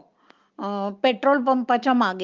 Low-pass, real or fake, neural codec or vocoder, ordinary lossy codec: 7.2 kHz; real; none; Opus, 24 kbps